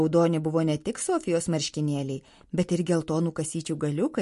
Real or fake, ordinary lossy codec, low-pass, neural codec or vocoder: real; MP3, 48 kbps; 14.4 kHz; none